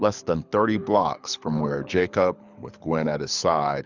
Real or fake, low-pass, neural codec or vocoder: fake; 7.2 kHz; codec, 24 kHz, 6 kbps, HILCodec